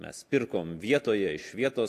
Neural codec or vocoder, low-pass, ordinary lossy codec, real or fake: none; 14.4 kHz; AAC, 64 kbps; real